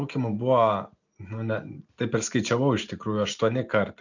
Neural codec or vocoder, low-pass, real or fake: none; 7.2 kHz; real